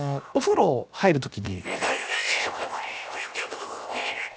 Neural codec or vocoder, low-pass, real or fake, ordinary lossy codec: codec, 16 kHz, 0.7 kbps, FocalCodec; none; fake; none